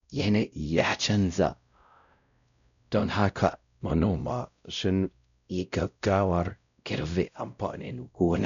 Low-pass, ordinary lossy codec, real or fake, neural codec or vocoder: 7.2 kHz; none; fake; codec, 16 kHz, 0.5 kbps, X-Codec, WavLM features, trained on Multilingual LibriSpeech